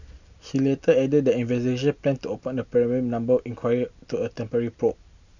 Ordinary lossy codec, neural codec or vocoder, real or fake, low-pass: none; none; real; 7.2 kHz